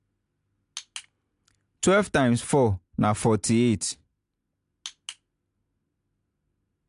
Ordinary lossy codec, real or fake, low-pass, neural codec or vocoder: AAC, 64 kbps; real; 10.8 kHz; none